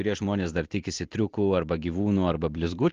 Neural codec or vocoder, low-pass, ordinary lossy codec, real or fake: none; 7.2 kHz; Opus, 16 kbps; real